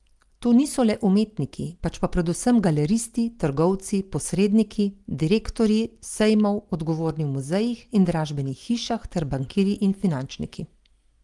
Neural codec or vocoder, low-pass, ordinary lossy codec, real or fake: none; 10.8 kHz; Opus, 24 kbps; real